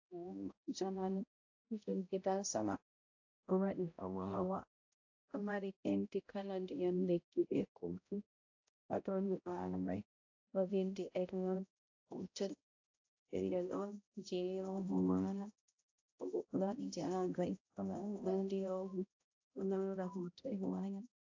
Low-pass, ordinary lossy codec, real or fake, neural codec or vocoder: 7.2 kHz; AAC, 48 kbps; fake; codec, 16 kHz, 0.5 kbps, X-Codec, HuBERT features, trained on balanced general audio